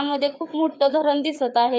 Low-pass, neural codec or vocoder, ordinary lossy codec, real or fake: none; codec, 16 kHz, 4 kbps, FreqCodec, larger model; none; fake